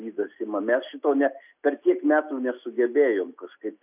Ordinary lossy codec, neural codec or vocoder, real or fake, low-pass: AAC, 32 kbps; none; real; 3.6 kHz